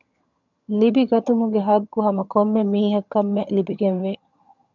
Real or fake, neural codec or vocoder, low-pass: fake; vocoder, 22.05 kHz, 80 mel bands, HiFi-GAN; 7.2 kHz